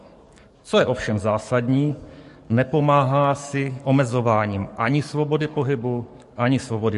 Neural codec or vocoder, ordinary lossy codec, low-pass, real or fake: codec, 44.1 kHz, 7.8 kbps, DAC; MP3, 48 kbps; 14.4 kHz; fake